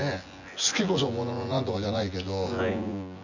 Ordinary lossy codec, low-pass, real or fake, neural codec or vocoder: none; 7.2 kHz; fake; vocoder, 24 kHz, 100 mel bands, Vocos